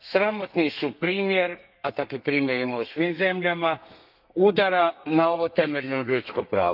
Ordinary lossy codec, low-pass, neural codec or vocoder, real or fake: none; 5.4 kHz; codec, 32 kHz, 1.9 kbps, SNAC; fake